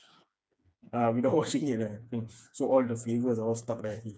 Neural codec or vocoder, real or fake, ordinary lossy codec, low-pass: codec, 16 kHz, 4 kbps, FreqCodec, smaller model; fake; none; none